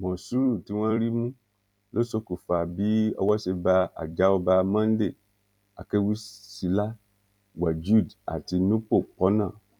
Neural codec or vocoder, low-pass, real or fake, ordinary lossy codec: vocoder, 44.1 kHz, 128 mel bands every 256 samples, BigVGAN v2; 19.8 kHz; fake; none